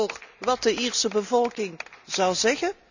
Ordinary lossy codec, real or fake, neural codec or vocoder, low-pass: none; real; none; 7.2 kHz